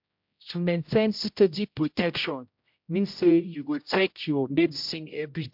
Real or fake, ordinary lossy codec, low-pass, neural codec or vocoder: fake; MP3, 48 kbps; 5.4 kHz; codec, 16 kHz, 0.5 kbps, X-Codec, HuBERT features, trained on general audio